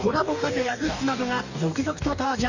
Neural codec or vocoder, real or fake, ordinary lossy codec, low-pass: codec, 44.1 kHz, 2.6 kbps, DAC; fake; none; 7.2 kHz